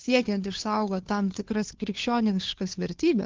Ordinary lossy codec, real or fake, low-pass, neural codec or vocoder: Opus, 16 kbps; fake; 7.2 kHz; codec, 16 kHz, 2 kbps, FunCodec, trained on Chinese and English, 25 frames a second